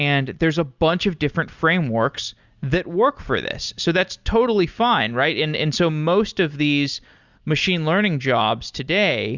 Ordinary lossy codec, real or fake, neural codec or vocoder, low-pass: Opus, 64 kbps; real; none; 7.2 kHz